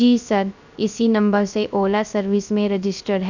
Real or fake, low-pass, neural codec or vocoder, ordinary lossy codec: fake; 7.2 kHz; codec, 16 kHz, 0.3 kbps, FocalCodec; none